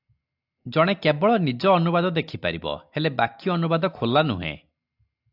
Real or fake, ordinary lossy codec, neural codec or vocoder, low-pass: real; AAC, 48 kbps; none; 5.4 kHz